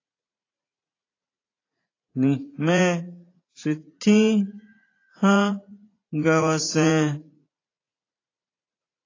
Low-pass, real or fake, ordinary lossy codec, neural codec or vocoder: 7.2 kHz; fake; AAC, 32 kbps; vocoder, 44.1 kHz, 80 mel bands, Vocos